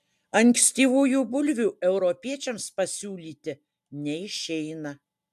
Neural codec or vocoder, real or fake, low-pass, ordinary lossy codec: none; real; 14.4 kHz; AAC, 96 kbps